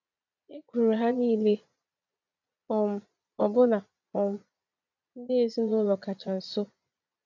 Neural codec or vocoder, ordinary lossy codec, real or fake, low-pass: vocoder, 22.05 kHz, 80 mel bands, Vocos; none; fake; 7.2 kHz